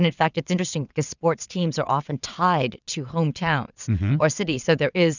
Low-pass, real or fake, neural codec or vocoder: 7.2 kHz; fake; vocoder, 22.05 kHz, 80 mel bands, WaveNeXt